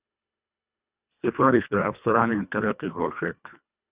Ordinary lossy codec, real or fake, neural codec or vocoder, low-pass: Opus, 64 kbps; fake; codec, 24 kHz, 1.5 kbps, HILCodec; 3.6 kHz